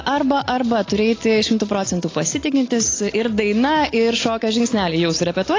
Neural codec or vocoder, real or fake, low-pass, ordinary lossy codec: none; real; 7.2 kHz; AAC, 32 kbps